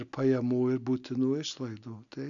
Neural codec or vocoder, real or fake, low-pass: none; real; 7.2 kHz